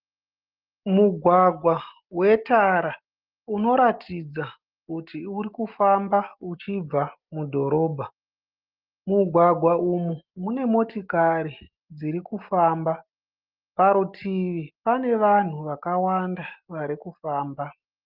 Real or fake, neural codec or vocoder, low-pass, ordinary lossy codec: real; none; 5.4 kHz; Opus, 24 kbps